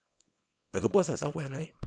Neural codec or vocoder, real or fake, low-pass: codec, 24 kHz, 0.9 kbps, WavTokenizer, small release; fake; 9.9 kHz